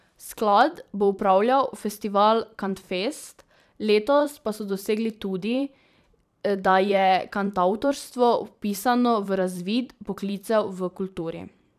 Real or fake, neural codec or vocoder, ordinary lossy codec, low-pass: fake; vocoder, 44.1 kHz, 128 mel bands every 512 samples, BigVGAN v2; none; 14.4 kHz